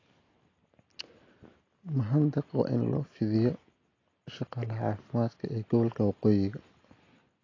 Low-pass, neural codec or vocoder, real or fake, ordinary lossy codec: 7.2 kHz; none; real; AAC, 32 kbps